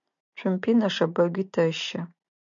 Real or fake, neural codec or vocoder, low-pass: real; none; 7.2 kHz